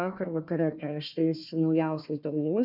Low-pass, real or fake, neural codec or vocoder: 5.4 kHz; fake; codec, 16 kHz, 1 kbps, FunCodec, trained on Chinese and English, 50 frames a second